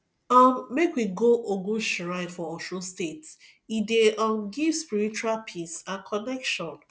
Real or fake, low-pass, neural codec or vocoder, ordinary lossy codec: real; none; none; none